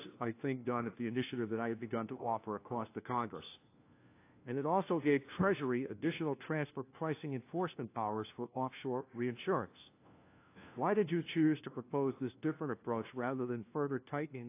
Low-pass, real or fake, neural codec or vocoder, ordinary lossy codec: 3.6 kHz; fake; codec, 16 kHz, 1 kbps, FunCodec, trained on LibriTTS, 50 frames a second; AAC, 24 kbps